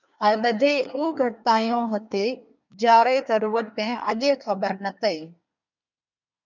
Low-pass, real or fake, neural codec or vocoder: 7.2 kHz; fake; codec, 24 kHz, 1 kbps, SNAC